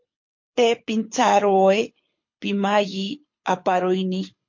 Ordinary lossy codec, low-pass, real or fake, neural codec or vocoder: MP3, 48 kbps; 7.2 kHz; fake; codec, 24 kHz, 6 kbps, HILCodec